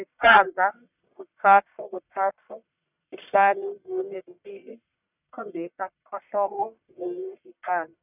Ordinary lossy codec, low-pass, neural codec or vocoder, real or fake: none; 3.6 kHz; codec, 44.1 kHz, 1.7 kbps, Pupu-Codec; fake